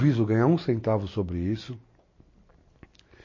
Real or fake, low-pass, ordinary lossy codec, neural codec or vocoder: real; 7.2 kHz; MP3, 32 kbps; none